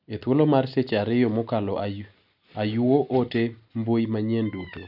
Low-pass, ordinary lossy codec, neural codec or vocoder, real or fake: 5.4 kHz; none; none; real